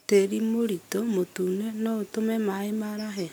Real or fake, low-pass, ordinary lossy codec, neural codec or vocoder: real; none; none; none